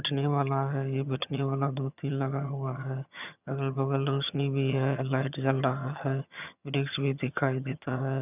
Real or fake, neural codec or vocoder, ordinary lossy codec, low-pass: fake; vocoder, 22.05 kHz, 80 mel bands, HiFi-GAN; none; 3.6 kHz